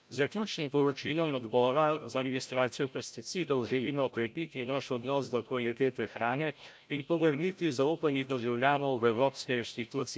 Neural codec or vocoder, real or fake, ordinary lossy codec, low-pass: codec, 16 kHz, 0.5 kbps, FreqCodec, larger model; fake; none; none